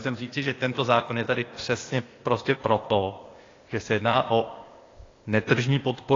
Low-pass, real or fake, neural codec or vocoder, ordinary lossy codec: 7.2 kHz; fake; codec, 16 kHz, 0.8 kbps, ZipCodec; AAC, 32 kbps